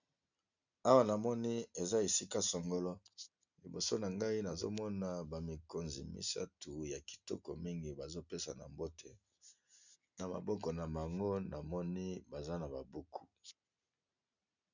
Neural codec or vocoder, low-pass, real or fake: none; 7.2 kHz; real